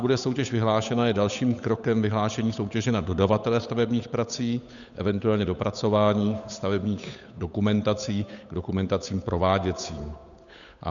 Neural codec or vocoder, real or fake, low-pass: codec, 16 kHz, 8 kbps, FunCodec, trained on Chinese and English, 25 frames a second; fake; 7.2 kHz